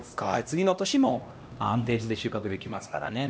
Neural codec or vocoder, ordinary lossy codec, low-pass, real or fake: codec, 16 kHz, 1 kbps, X-Codec, HuBERT features, trained on LibriSpeech; none; none; fake